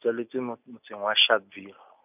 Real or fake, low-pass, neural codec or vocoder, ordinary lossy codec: real; 3.6 kHz; none; none